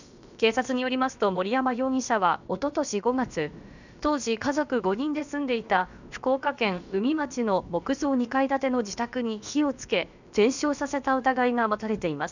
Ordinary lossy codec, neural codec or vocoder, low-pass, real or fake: none; codec, 16 kHz, about 1 kbps, DyCAST, with the encoder's durations; 7.2 kHz; fake